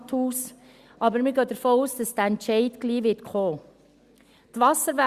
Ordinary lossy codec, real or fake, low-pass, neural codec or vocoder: Opus, 64 kbps; real; 14.4 kHz; none